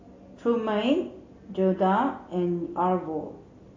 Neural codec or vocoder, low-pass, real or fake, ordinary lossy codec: none; 7.2 kHz; real; AAC, 32 kbps